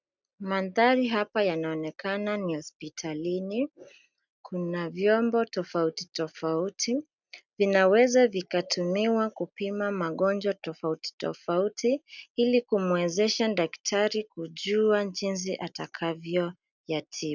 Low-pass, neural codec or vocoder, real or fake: 7.2 kHz; none; real